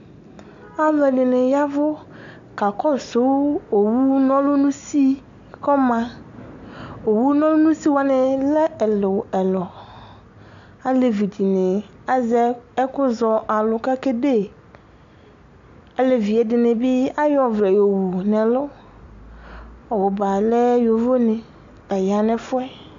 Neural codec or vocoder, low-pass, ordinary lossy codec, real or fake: none; 7.2 kHz; AAC, 64 kbps; real